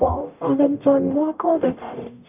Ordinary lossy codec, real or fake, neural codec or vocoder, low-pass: none; fake; codec, 44.1 kHz, 0.9 kbps, DAC; 3.6 kHz